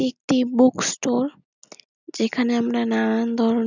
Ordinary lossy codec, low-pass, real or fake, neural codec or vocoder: none; 7.2 kHz; real; none